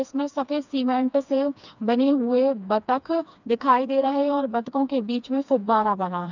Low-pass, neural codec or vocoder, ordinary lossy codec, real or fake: 7.2 kHz; codec, 16 kHz, 2 kbps, FreqCodec, smaller model; none; fake